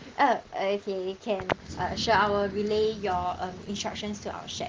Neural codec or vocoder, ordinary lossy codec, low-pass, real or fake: none; Opus, 16 kbps; 7.2 kHz; real